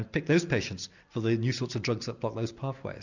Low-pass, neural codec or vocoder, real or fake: 7.2 kHz; none; real